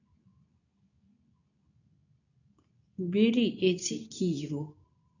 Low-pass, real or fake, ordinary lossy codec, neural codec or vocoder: 7.2 kHz; fake; none; codec, 24 kHz, 0.9 kbps, WavTokenizer, medium speech release version 2